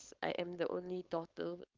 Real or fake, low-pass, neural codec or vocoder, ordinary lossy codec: fake; 7.2 kHz; codec, 16 kHz, 4.8 kbps, FACodec; Opus, 32 kbps